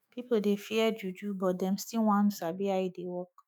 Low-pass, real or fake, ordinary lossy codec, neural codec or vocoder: none; fake; none; autoencoder, 48 kHz, 128 numbers a frame, DAC-VAE, trained on Japanese speech